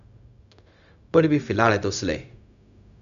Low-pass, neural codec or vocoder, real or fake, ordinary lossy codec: 7.2 kHz; codec, 16 kHz, 0.4 kbps, LongCat-Audio-Codec; fake; none